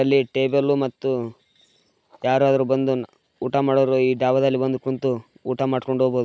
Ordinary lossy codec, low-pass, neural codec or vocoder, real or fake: none; none; none; real